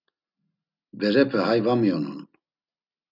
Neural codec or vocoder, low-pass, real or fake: none; 5.4 kHz; real